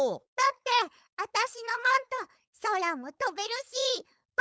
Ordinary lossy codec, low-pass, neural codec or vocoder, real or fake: none; none; codec, 16 kHz, 4.8 kbps, FACodec; fake